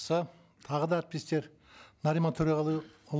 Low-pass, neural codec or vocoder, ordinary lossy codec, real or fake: none; none; none; real